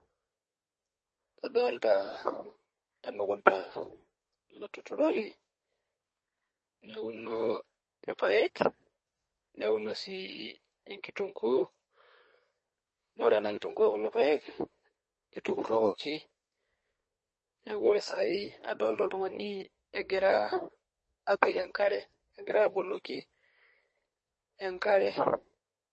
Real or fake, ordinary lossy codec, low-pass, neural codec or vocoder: fake; MP3, 32 kbps; 10.8 kHz; codec, 24 kHz, 1 kbps, SNAC